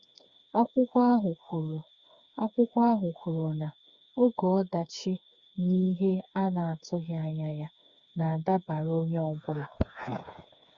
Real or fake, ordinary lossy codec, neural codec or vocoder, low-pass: fake; Opus, 64 kbps; codec, 16 kHz, 4 kbps, FreqCodec, smaller model; 7.2 kHz